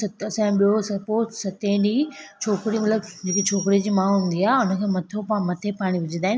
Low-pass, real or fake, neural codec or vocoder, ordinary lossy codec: none; real; none; none